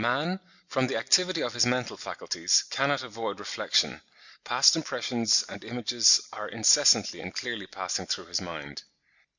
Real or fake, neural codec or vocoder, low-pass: real; none; 7.2 kHz